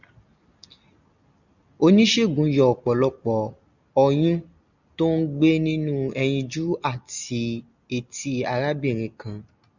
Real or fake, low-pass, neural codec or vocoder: real; 7.2 kHz; none